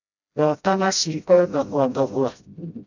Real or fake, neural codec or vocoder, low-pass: fake; codec, 16 kHz, 0.5 kbps, FreqCodec, smaller model; 7.2 kHz